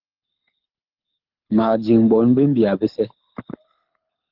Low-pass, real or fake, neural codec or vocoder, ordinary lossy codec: 5.4 kHz; fake; codec, 24 kHz, 6 kbps, HILCodec; Opus, 24 kbps